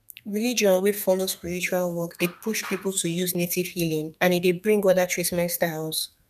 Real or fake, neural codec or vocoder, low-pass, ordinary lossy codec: fake; codec, 32 kHz, 1.9 kbps, SNAC; 14.4 kHz; none